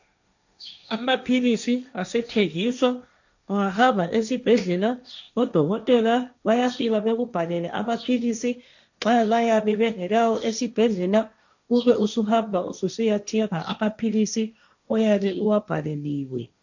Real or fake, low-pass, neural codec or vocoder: fake; 7.2 kHz; codec, 16 kHz, 1.1 kbps, Voila-Tokenizer